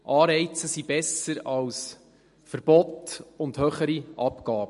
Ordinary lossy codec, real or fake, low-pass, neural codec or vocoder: MP3, 48 kbps; real; 14.4 kHz; none